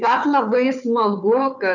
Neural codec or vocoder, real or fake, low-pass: codec, 16 kHz, 4 kbps, FunCodec, trained on Chinese and English, 50 frames a second; fake; 7.2 kHz